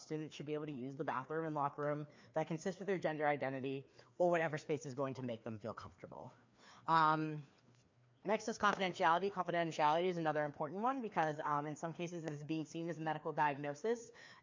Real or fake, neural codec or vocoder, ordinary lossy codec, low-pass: fake; codec, 16 kHz, 2 kbps, FreqCodec, larger model; MP3, 48 kbps; 7.2 kHz